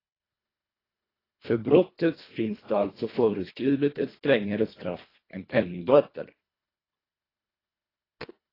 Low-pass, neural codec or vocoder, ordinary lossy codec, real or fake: 5.4 kHz; codec, 24 kHz, 1.5 kbps, HILCodec; AAC, 24 kbps; fake